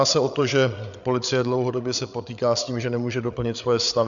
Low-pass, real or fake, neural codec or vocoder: 7.2 kHz; fake; codec, 16 kHz, 8 kbps, FreqCodec, larger model